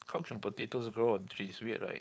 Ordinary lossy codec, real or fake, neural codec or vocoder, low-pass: none; fake; codec, 16 kHz, 4.8 kbps, FACodec; none